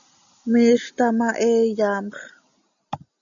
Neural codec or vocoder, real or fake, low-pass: none; real; 7.2 kHz